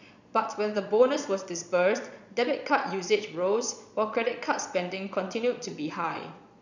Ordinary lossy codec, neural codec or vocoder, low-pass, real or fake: none; vocoder, 22.05 kHz, 80 mel bands, Vocos; 7.2 kHz; fake